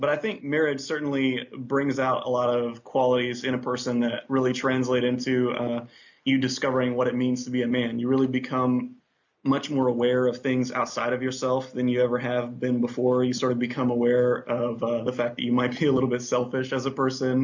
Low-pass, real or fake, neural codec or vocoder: 7.2 kHz; real; none